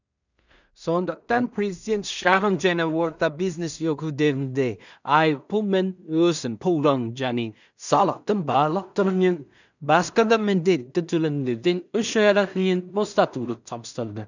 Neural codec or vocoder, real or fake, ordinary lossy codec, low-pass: codec, 16 kHz in and 24 kHz out, 0.4 kbps, LongCat-Audio-Codec, two codebook decoder; fake; none; 7.2 kHz